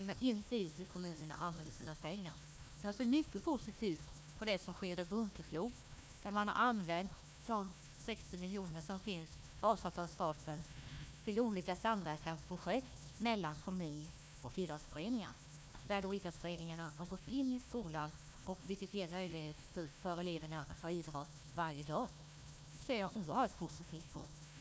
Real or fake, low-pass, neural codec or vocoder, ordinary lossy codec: fake; none; codec, 16 kHz, 1 kbps, FunCodec, trained on Chinese and English, 50 frames a second; none